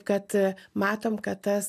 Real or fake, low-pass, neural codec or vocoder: real; 14.4 kHz; none